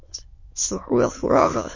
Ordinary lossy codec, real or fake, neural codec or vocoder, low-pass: MP3, 32 kbps; fake; autoencoder, 22.05 kHz, a latent of 192 numbers a frame, VITS, trained on many speakers; 7.2 kHz